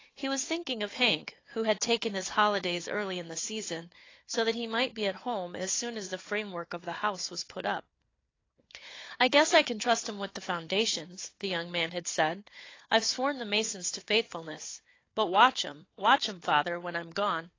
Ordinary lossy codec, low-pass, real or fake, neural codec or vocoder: AAC, 32 kbps; 7.2 kHz; fake; codec, 16 kHz, 4 kbps, FunCodec, trained on Chinese and English, 50 frames a second